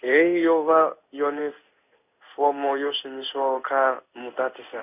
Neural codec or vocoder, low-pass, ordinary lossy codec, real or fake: codec, 16 kHz in and 24 kHz out, 1 kbps, XY-Tokenizer; 3.6 kHz; none; fake